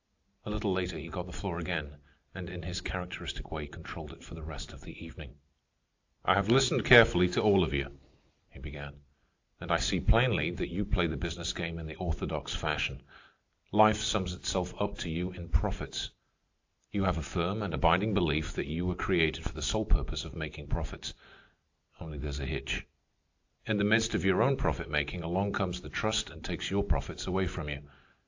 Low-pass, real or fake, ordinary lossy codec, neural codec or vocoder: 7.2 kHz; real; AAC, 48 kbps; none